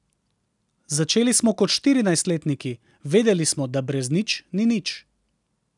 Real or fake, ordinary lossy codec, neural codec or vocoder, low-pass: real; none; none; 10.8 kHz